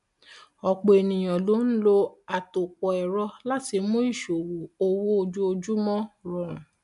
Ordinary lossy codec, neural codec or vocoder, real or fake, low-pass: MP3, 96 kbps; none; real; 10.8 kHz